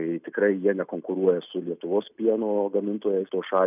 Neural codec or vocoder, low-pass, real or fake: none; 3.6 kHz; real